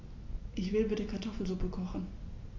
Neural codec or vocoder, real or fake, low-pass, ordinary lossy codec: none; real; 7.2 kHz; AAC, 32 kbps